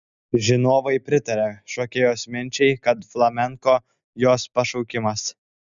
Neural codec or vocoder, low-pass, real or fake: none; 7.2 kHz; real